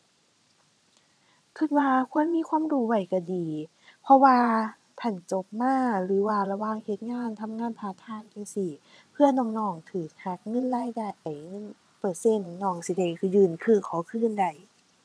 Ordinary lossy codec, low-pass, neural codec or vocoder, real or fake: none; none; vocoder, 22.05 kHz, 80 mel bands, Vocos; fake